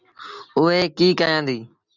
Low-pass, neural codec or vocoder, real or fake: 7.2 kHz; none; real